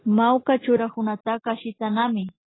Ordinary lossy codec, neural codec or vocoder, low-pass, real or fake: AAC, 16 kbps; none; 7.2 kHz; real